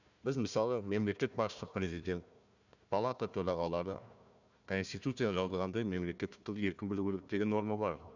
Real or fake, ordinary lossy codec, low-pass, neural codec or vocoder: fake; none; 7.2 kHz; codec, 16 kHz, 1 kbps, FunCodec, trained on Chinese and English, 50 frames a second